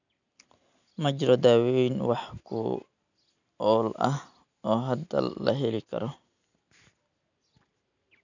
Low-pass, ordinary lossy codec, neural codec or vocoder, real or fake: 7.2 kHz; none; none; real